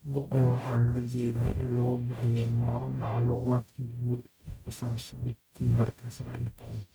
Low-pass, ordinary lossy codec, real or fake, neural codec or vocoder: none; none; fake; codec, 44.1 kHz, 0.9 kbps, DAC